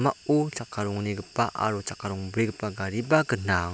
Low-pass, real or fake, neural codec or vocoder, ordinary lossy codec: none; real; none; none